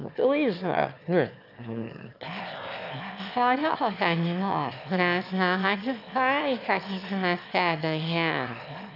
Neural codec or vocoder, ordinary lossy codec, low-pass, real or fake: autoencoder, 22.05 kHz, a latent of 192 numbers a frame, VITS, trained on one speaker; none; 5.4 kHz; fake